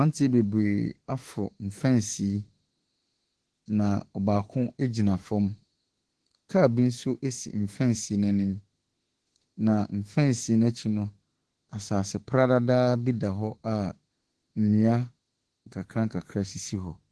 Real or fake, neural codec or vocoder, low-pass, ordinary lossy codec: fake; autoencoder, 48 kHz, 32 numbers a frame, DAC-VAE, trained on Japanese speech; 10.8 kHz; Opus, 16 kbps